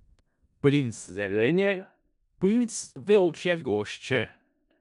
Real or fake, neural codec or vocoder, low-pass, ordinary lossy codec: fake; codec, 16 kHz in and 24 kHz out, 0.4 kbps, LongCat-Audio-Codec, four codebook decoder; 10.8 kHz; none